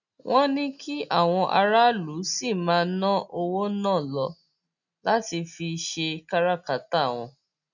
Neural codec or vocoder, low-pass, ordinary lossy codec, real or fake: none; 7.2 kHz; none; real